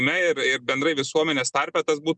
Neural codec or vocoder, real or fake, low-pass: none; real; 10.8 kHz